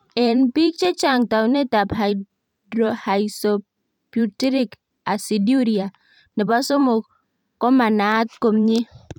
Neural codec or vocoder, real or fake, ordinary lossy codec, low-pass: vocoder, 44.1 kHz, 128 mel bands every 512 samples, BigVGAN v2; fake; none; 19.8 kHz